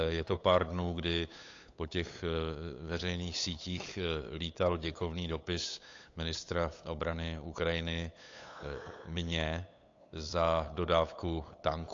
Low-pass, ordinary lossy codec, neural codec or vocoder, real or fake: 7.2 kHz; AAC, 48 kbps; codec, 16 kHz, 8 kbps, FunCodec, trained on LibriTTS, 25 frames a second; fake